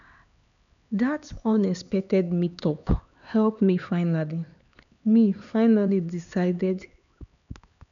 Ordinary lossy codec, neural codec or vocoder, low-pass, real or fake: none; codec, 16 kHz, 4 kbps, X-Codec, HuBERT features, trained on LibriSpeech; 7.2 kHz; fake